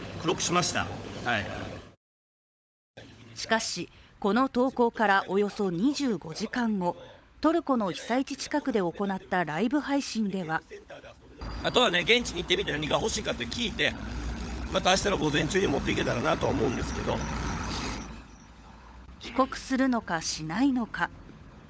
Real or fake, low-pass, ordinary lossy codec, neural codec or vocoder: fake; none; none; codec, 16 kHz, 16 kbps, FunCodec, trained on LibriTTS, 50 frames a second